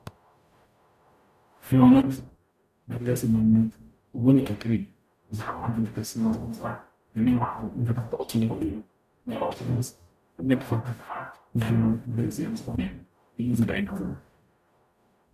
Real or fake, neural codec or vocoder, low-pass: fake; codec, 44.1 kHz, 0.9 kbps, DAC; 14.4 kHz